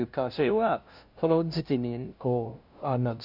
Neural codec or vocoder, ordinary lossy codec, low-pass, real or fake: codec, 16 kHz, 0.5 kbps, FunCodec, trained on LibriTTS, 25 frames a second; none; 5.4 kHz; fake